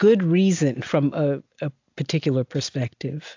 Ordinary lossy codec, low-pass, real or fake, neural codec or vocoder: AAC, 48 kbps; 7.2 kHz; real; none